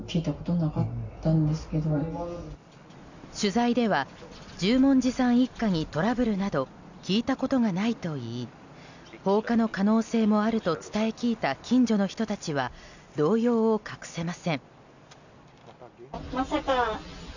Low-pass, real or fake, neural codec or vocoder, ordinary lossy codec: 7.2 kHz; real; none; none